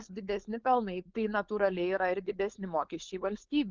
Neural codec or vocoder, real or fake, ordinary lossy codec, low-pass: codec, 16 kHz, 4.8 kbps, FACodec; fake; Opus, 24 kbps; 7.2 kHz